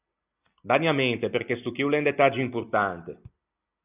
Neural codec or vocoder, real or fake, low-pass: none; real; 3.6 kHz